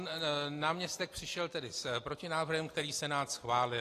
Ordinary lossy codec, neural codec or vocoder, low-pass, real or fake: AAC, 48 kbps; none; 14.4 kHz; real